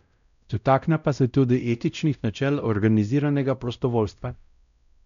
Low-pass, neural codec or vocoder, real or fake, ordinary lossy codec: 7.2 kHz; codec, 16 kHz, 0.5 kbps, X-Codec, WavLM features, trained on Multilingual LibriSpeech; fake; none